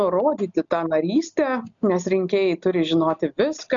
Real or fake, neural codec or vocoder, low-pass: real; none; 7.2 kHz